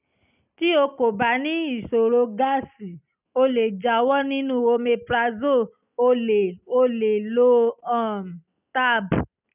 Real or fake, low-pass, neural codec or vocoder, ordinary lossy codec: real; 3.6 kHz; none; none